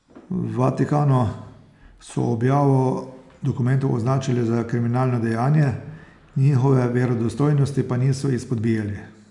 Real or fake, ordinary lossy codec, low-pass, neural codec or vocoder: real; none; 10.8 kHz; none